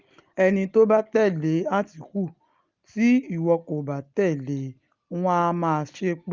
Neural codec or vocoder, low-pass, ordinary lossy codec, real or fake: none; 7.2 kHz; Opus, 32 kbps; real